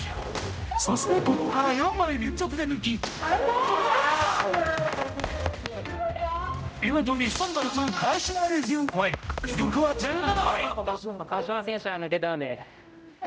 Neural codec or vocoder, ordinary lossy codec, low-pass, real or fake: codec, 16 kHz, 0.5 kbps, X-Codec, HuBERT features, trained on general audio; none; none; fake